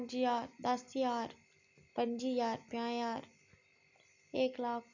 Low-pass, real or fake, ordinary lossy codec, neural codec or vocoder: 7.2 kHz; real; none; none